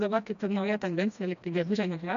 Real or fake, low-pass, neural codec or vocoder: fake; 7.2 kHz; codec, 16 kHz, 1 kbps, FreqCodec, smaller model